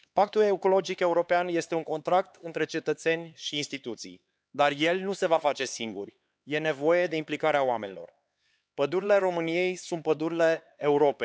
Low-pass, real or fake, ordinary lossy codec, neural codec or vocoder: none; fake; none; codec, 16 kHz, 4 kbps, X-Codec, HuBERT features, trained on LibriSpeech